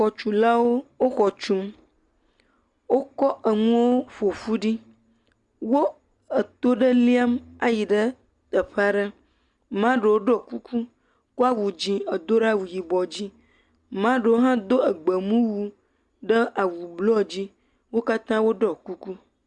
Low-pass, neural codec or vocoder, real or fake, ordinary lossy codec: 10.8 kHz; none; real; Opus, 64 kbps